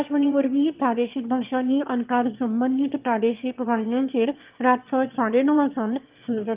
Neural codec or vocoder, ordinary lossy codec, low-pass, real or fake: autoencoder, 22.05 kHz, a latent of 192 numbers a frame, VITS, trained on one speaker; Opus, 32 kbps; 3.6 kHz; fake